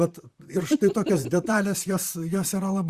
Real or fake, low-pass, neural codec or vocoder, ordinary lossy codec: fake; 14.4 kHz; vocoder, 44.1 kHz, 128 mel bands, Pupu-Vocoder; Opus, 64 kbps